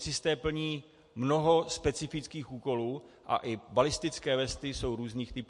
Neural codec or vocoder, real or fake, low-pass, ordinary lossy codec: none; real; 10.8 kHz; MP3, 48 kbps